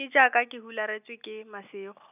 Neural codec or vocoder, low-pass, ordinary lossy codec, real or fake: none; 3.6 kHz; none; real